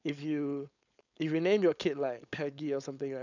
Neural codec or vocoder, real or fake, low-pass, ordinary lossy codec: codec, 16 kHz, 4.8 kbps, FACodec; fake; 7.2 kHz; none